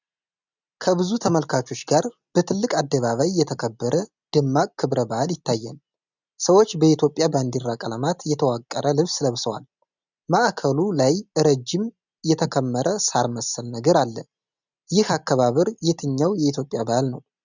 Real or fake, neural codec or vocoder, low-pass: real; none; 7.2 kHz